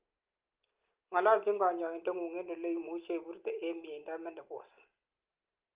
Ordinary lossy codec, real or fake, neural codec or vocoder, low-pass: Opus, 32 kbps; real; none; 3.6 kHz